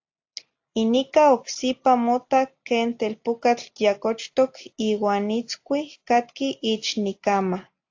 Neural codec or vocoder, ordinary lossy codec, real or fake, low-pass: none; AAC, 48 kbps; real; 7.2 kHz